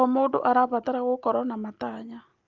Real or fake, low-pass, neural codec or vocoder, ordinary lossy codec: real; 7.2 kHz; none; Opus, 32 kbps